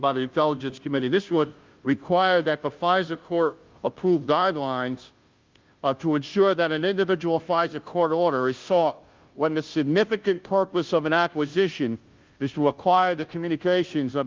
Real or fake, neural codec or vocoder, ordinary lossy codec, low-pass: fake; codec, 16 kHz, 0.5 kbps, FunCodec, trained on Chinese and English, 25 frames a second; Opus, 32 kbps; 7.2 kHz